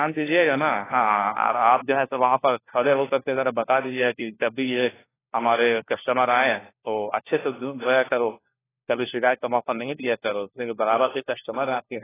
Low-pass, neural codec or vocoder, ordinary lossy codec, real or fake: 3.6 kHz; codec, 16 kHz, 1 kbps, FunCodec, trained on LibriTTS, 50 frames a second; AAC, 16 kbps; fake